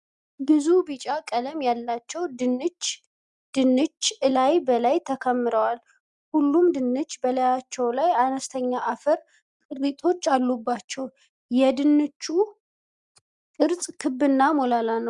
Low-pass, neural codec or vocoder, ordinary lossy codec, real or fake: 10.8 kHz; none; Opus, 64 kbps; real